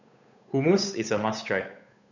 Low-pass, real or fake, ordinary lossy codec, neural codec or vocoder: 7.2 kHz; fake; none; codec, 16 kHz, 8 kbps, FunCodec, trained on Chinese and English, 25 frames a second